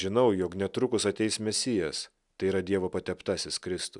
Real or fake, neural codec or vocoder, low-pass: real; none; 10.8 kHz